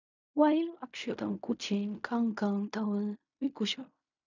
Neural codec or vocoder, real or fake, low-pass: codec, 16 kHz in and 24 kHz out, 0.4 kbps, LongCat-Audio-Codec, fine tuned four codebook decoder; fake; 7.2 kHz